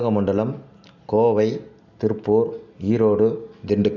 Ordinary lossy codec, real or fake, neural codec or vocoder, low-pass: none; real; none; 7.2 kHz